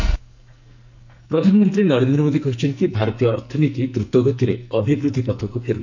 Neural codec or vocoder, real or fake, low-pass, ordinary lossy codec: codec, 44.1 kHz, 2.6 kbps, SNAC; fake; 7.2 kHz; none